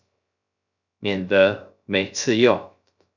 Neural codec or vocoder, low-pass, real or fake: codec, 16 kHz, 0.3 kbps, FocalCodec; 7.2 kHz; fake